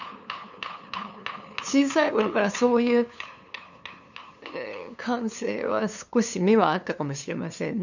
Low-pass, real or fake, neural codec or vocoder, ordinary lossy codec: 7.2 kHz; fake; codec, 16 kHz, 2 kbps, FunCodec, trained on LibriTTS, 25 frames a second; none